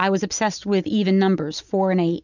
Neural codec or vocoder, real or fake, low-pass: none; real; 7.2 kHz